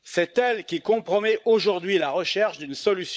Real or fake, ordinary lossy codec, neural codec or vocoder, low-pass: fake; none; codec, 16 kHz, 16 kbps, FunCodec, trained on LibriTTS, 50 frames a second; none